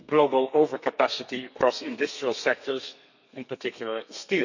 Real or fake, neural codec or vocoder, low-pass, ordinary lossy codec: fake; codec, 32 kHz, 1.9 kbps, SNAC; 7.2 kHz; none